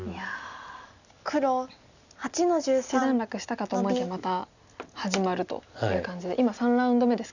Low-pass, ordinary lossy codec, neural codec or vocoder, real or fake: 7.2 kHz; none; none; real